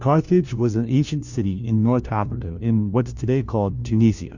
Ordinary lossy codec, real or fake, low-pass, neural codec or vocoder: Opus, 64 kbps; fake; 7.2 kHz; codec, 16 kHz, 1 kbps, FunCodec, trained on LibriTTS, 50 frames a second